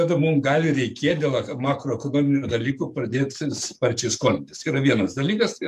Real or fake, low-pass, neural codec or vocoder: fake; 14.4 kHz; codec, 44.1 kHz, 7.8 kbps, DAC